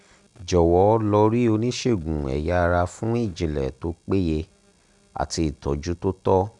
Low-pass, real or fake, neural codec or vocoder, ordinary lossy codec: 10.8 kHz; real; none; none